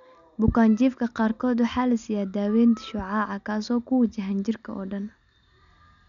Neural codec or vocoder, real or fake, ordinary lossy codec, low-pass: none; real; none; 7.2 kHz